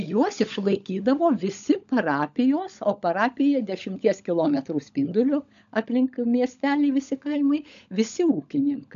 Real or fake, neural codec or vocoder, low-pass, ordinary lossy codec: fake; codec, 16 kHz, 16 kbps, FunCodec, trained on LibriTTS, 50 frames a second; 7.2 kHz; AAC, 96 kbps